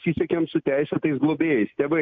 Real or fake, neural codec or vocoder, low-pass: real; none; 7.2 kHz